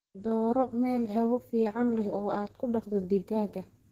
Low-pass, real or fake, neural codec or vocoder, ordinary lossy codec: 14.4 kHz; fake; codec, 32 kHz, 1.9 kbps, SNAC; Opus, 16 kbps